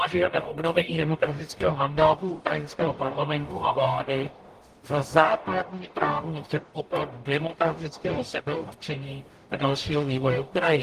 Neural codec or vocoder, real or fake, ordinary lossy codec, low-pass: codec, 44.1 kHz, 0.9 kbps, DAC; fake; Opus, 24 kbps; 14.4 kHz